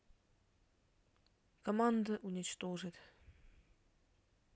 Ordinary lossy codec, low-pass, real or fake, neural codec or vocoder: none; none; real; none